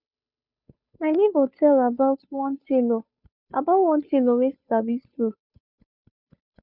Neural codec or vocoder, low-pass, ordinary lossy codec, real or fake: codec, 16 kHz, 2 kbps, FunCodec, trained on Chinese and English, 25 frames a second; 5.4 kHz; none; fake